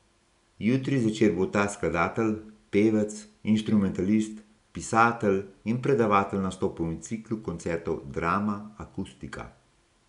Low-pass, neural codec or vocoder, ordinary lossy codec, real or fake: 10.8 kHz; none; none; real